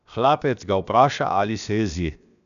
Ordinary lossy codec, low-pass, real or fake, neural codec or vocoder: none; 7.2 kHz; fake; codec, 16 kHz, 0.7 kbps, FocalCodec